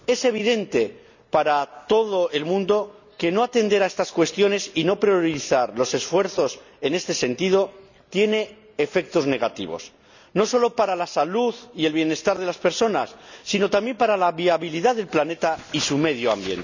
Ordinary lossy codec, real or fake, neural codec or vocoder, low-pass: none; real; none; 7.2 kHz